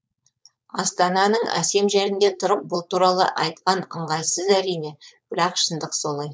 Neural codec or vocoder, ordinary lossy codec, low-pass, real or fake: codec, 16 kHz, 4.8 kbps, FACodec; none; none; fake